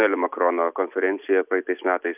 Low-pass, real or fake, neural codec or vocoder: 3.6 kHz; real; none